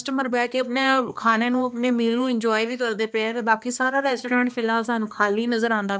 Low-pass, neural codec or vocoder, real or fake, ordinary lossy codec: none; codec, 16 kHz, 2 kbps, X-Codec, HuBERT features, trained on balanced general audio; fake; none